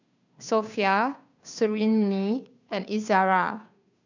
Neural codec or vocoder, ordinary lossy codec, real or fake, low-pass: codec, 16 kHz, 2 kbps, FunCodec, trained on Chinese and English, 25 frames a second; none; fake; 7.2 kHz